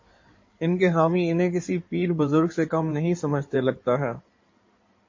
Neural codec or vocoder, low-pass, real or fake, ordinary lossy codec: codec, 16 kHz in and 24 kHz out, 2.2 kbps, FireRedTTS-2 codec; 7.2 kHz; fake; MP3, 32 kbps